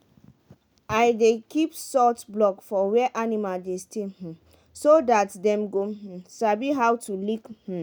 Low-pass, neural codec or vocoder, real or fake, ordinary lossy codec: none; none; real; none